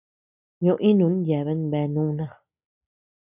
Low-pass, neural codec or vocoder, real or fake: 3.6 kHz; none; real